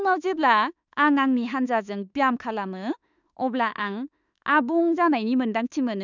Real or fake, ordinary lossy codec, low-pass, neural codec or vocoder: fake; none; 7.2 kHz; autoencoder, 48 kHz, 32 numbers a frame, DAC-VAE, trained on Japanese speech